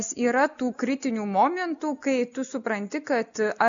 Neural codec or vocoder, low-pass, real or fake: none; 7.2 kHz; real